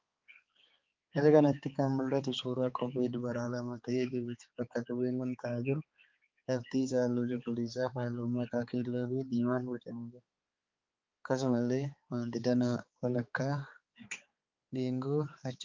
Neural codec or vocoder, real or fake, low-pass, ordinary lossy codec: codec, 16 kHz, 4 kbps, X-Codec, HuBERT features, trained on balanced general audio; fake; 7.2 kHz; Opus, 24 kbps